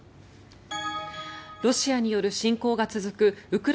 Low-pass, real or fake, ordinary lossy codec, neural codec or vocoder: none; real; none; none